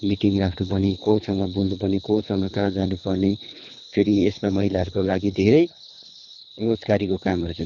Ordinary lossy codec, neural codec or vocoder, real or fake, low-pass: none; codec, 24 kHz, 3 kbps, HILCodec; fake; 7.2 kHz